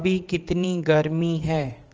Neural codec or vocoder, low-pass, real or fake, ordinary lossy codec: vocoder, 44.1 kHz, 80 mel bands, Vocos; 7.2 kHz; fake; Opus, 24 kbps